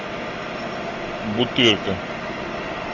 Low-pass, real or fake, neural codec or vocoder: 7.2 kHz; real; none